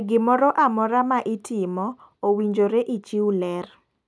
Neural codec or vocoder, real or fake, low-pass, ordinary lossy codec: none; real; none; none